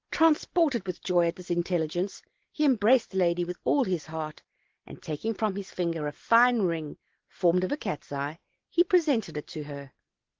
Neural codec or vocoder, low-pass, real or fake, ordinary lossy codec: none; 7.2 kHz; real; Opus, 16 kbps